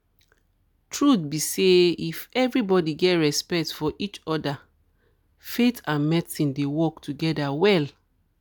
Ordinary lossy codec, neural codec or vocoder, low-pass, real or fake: none; none; none; real